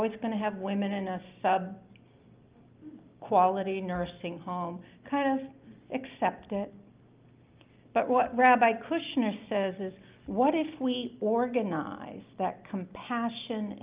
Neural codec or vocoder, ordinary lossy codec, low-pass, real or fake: none; Opus, 24 kbps; 3.6 kHz; real